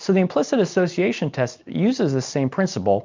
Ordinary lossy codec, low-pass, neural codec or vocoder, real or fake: MP3, 64 kbps; 7.2 kHz; none; real